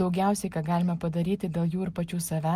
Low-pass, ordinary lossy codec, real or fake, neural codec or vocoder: 14.4 kHz; Opus, 32 kbps; real; none